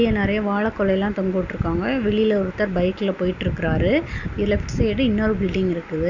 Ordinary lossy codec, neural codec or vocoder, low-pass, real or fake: none; none; 7.2 kHz; real